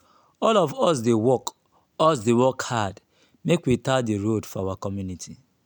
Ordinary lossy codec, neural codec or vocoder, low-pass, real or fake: none; none; none; real